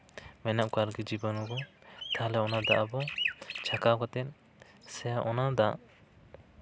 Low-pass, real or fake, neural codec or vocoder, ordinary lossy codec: none; real; none; none